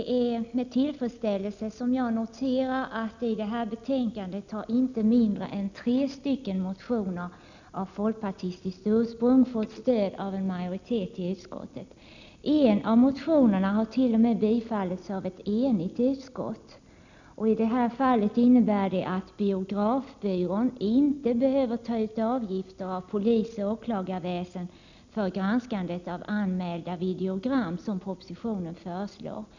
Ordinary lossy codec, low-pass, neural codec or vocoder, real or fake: none; 7.2 kHz; none; real